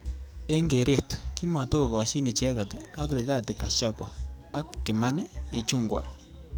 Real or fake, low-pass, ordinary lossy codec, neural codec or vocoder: fake; none; none; codec, 44.1 kHz, 2.6 kbps, SNAC